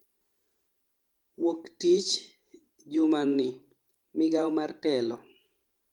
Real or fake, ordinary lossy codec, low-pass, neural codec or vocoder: fake; Opus, 32 kbps; 19.8 kHz; vocoder, 44.1 kHz, 128 mel bands every 512 samples, BigVGAN v2